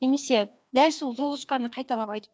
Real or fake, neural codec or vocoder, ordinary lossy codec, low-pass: fake; codec, 16 kHz, 2 kbps, FreqCodec, larger model; none; none